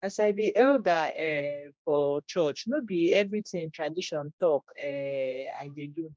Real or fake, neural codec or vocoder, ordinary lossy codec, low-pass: fake; codec, 16 kHz, 1 kbps, X-Codec, HuBERT features, trained on general audio; none; none